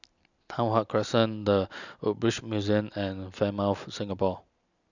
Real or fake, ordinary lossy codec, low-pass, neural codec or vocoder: real; none; 7.2 kHz; none